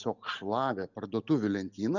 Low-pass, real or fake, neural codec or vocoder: 7.2 kHz; real; none